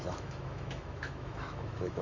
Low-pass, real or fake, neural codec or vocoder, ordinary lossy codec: 7.2 kHz; fake; autoencoder, 48 kHz, 128 numbers a frame, DAC-VAE, trained on Japanese speech; MP3, 64 kbps